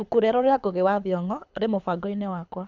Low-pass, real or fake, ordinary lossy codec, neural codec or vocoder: 7.2 kHz; fake; none; codec, 24 kHz, 6 kbps, HILCodec